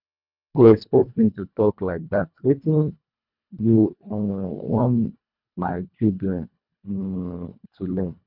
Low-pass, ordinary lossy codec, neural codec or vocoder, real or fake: 5.4 kHz; none; codec, 24 kHz, 1.5 kbps, HILCodec; fake